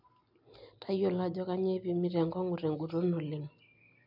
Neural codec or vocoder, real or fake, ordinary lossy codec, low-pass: none; real; none; 5.4 kHz